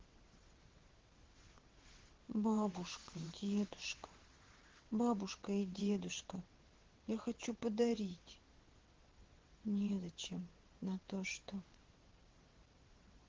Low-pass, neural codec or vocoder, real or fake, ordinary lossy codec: 7.2 kHz; vocoder, 22.05 kHz, 80 mel bands, WaveNeXt; fake; Opus, 16 kbps